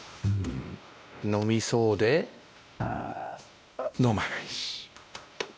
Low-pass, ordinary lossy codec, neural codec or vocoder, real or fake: none; none; codec, 16 kHz, 1 kbps, X-Codec, WavLM features, trained on Multilingual LibriSpeech; fake